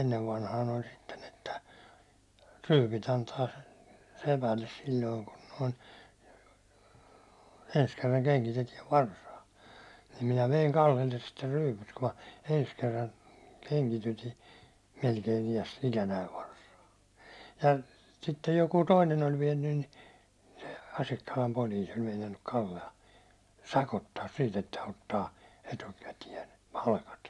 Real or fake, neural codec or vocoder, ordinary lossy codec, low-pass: real; none; none; none